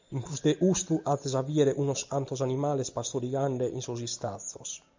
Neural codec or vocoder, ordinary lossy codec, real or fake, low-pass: none; MP3, 64 kbps; real; 7.2 kHz